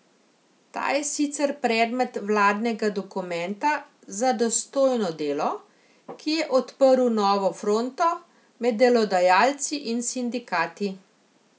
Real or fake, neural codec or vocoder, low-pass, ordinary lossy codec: real; none; none; none